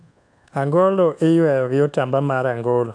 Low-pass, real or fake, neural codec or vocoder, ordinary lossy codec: 9.9 kHz; fake; codec, 24 kHz, 1.2 kbps, DualCodec; none